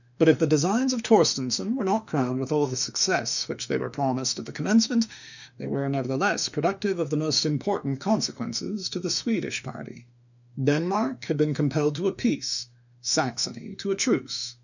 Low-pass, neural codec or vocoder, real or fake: 7.2 kHz; autoencoder, 48 kHz, 32 numbers a frame, DAC-VAE, trained on Japanese speech; fake